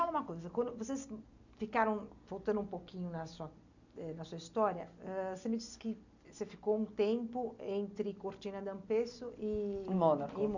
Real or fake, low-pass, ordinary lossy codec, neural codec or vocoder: real; 7.2 kHz; none; none